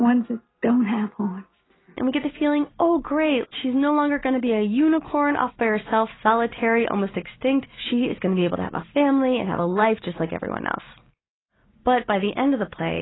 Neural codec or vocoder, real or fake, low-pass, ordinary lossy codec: none; real; 7.2 kHz; AAC, 16 kbps